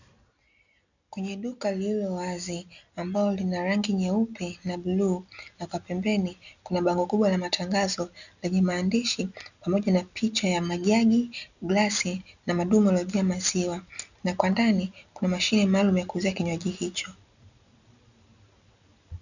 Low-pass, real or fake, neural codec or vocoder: 7.2 kHz; real; none